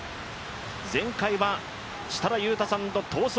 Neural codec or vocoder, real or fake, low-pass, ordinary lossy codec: none; real; none; none